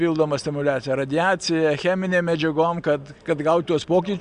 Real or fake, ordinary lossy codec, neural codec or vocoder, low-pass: real; Opus, 64 kbps; none; 9.9 kHz